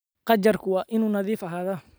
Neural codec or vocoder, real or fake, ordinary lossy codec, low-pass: none; real; none; none